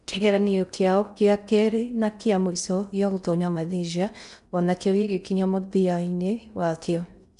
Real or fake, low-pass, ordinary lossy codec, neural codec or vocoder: fake; 10.8 kHz; none; codec, 16 kHz in and 24 kHz out, 0.6 kbps, FocalCodec, streaming, 4096 codes